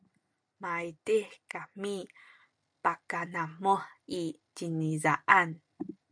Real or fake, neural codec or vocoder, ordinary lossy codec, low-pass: real; none; MP3, 64 kbps; 9.9 kHz